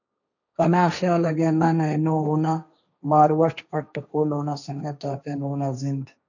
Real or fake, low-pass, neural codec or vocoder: fake; 7.2 kHz; codec, 16 kHz, 1.1 kbps, Voila-Tokenizer